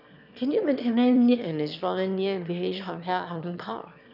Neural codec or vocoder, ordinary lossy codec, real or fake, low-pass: autoencoder, 22.05 kHz, a latent of 192 numbers a frame, VITS, trained on one speaker; none; fake; 5.4 kHz